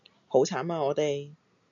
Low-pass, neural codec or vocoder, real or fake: 7.2 kHz; none; real